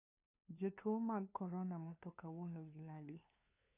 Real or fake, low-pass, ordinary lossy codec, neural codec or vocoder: fake; 3.6 kHz; none; codec, 16 kHz, 2 kbps, FunCodec, trained on LibriTTS, 25 frames a second